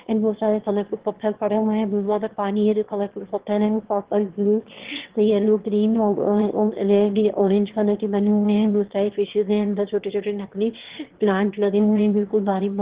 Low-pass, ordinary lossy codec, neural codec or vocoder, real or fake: 3.6 kHz; Opus, 16 kbps; autoencoder, 22.05 kHz, a latent of 192 numbers a frame, VITS, trained on one speaker; fake